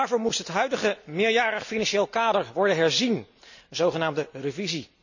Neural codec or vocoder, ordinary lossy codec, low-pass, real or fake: none; none; 7.2 kHz; real